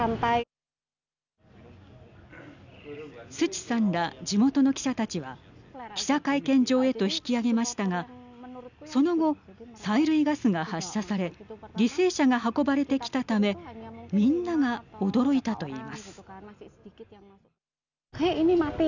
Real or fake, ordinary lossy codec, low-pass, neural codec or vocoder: real; none; 7.2 kHz; none